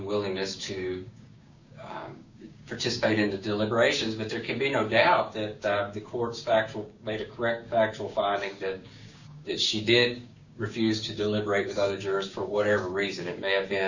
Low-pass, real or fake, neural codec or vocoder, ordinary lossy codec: 7.2 kHz; fake; codec, 16 kHz, 6 kbps, DAC; Opus, 64 kbps